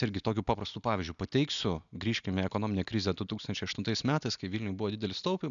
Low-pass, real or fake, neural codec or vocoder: 7.2 kHz; real; none